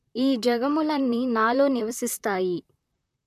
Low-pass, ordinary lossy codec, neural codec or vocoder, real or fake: 14.4 kHz; MP3, 96 kbps; vocoder, 44.1 kHz, 128 mel bands, Pupu-Vocoder; fake